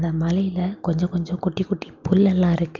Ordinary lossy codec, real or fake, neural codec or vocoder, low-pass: Opus, 24 kbps; real; none; 7.2 kHz